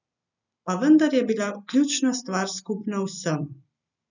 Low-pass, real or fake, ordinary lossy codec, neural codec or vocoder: 7.2 kHz; real; none; none